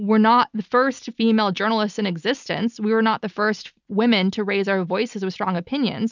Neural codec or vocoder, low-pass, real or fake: none; 7.2 kHz; real